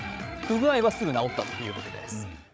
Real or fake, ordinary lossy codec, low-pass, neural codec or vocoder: fake; none; none; codec, 16 kHz, 16 kbps, FreqCodec, larger model